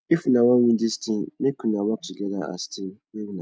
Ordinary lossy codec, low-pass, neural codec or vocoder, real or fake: none; none; none; real